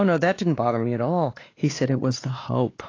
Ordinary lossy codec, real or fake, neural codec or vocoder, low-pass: AAC, 32 kbps; fake; codec, 16 kHz, 2 kbps, X-Codec, WavLM features, trained on Multilingual LibriSpeech; 7.2 kHz